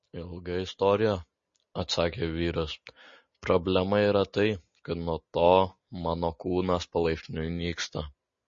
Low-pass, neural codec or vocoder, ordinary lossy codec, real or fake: 7.2 kHz; none; MP3, 32 kbps; real